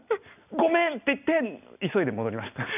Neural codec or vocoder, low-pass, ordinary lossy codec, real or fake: none; 3.6 kHz; none; real